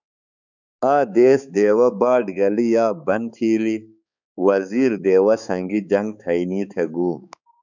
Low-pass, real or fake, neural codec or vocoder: 7.2 kHz; fake; codec, 16 kHz, 4 kbps, X-Codec, HuBERT features, trained on balanced general audio